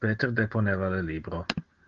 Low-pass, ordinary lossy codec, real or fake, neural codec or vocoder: 7.2 kHz; Opus, 16 kbps; real; none